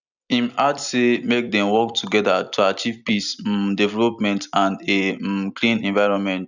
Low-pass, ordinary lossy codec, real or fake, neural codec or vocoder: 7.2 kHz; none; fake; vocoder, 44.1 kHz, 128 mel bands every 256 samples, BigVGAN v2